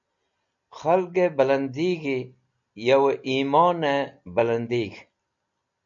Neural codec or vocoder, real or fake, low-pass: none; real; 7.2 kHz